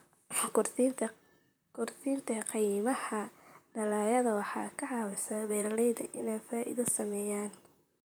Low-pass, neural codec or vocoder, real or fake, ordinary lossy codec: none; vocoder, 44.1 kHz, 128 mel bands, Pupu-Vocoder; fake; none